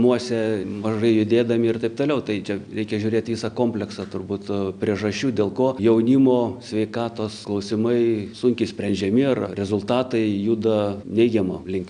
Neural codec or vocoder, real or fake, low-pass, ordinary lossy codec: none; real; 9.9 kHz; MP3, 96 kbps